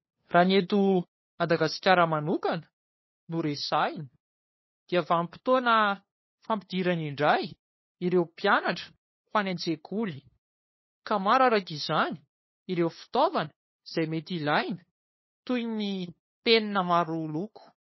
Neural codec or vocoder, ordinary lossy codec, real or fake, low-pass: codec, 16 kHz, 2 kbps, FunCodec, trained on LibriTTS, 25 frames a second; MP3, 24 kbps; fake; 7.2 kHz